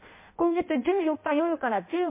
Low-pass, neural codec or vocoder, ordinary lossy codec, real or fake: 3.6 kHz; codec, 16 kHz in and 24 kHz out, 0.6 kbps, FireRedTTS-2 codec; MP3, 24 kbps; fake